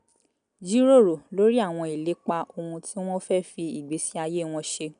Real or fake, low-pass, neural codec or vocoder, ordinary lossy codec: real; 9.9 kHz; none; none